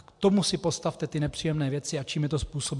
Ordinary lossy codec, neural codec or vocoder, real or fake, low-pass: MP3, 64 kbps; none; real; 10.8 kHz